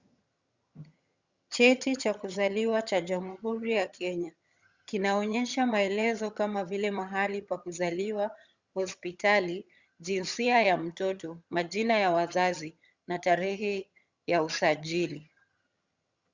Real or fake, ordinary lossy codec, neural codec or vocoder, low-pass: fake; Opus, 64 kbps; vocoder, 22.05 kHz, 80 mel bands, HiFi-GAN; 7.2 kHz